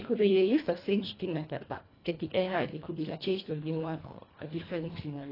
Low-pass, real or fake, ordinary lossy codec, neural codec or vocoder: 5.4 kHz; fake; AAC, 24 kbps; codec, 24 kHz, 1.5 kbps, HILCodec